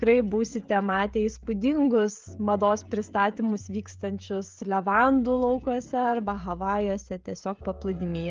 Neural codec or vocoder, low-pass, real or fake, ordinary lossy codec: codec, 16 kHz, 16 kbps, FreqCodec, smaller model; 7.2 kHz; fake; Opus, 32 kbps